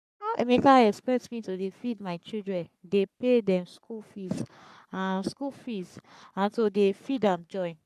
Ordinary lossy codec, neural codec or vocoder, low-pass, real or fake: none; codec, 44.1 kHz, 3.4 kbps, Pupu-Codec; 14.4 kHz; fake